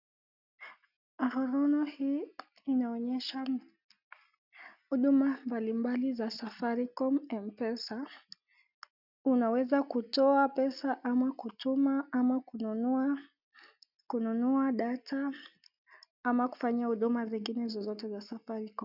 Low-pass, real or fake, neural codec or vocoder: 5.4 kHz; real; none